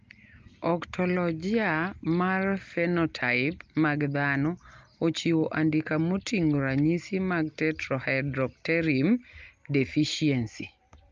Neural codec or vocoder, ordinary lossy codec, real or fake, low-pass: none; Opus, 24 kbps; real; 7.2 kHz